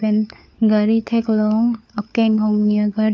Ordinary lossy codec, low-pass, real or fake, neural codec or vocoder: none; none; fake; codec, 16 kHz, 4 kbps, FunCodec, trained on LibriTTS, 50 frames a second